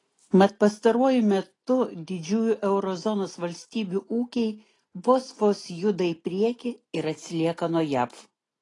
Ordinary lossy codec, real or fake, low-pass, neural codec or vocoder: AAC, 32 kbps; real; 10.8 kHz; none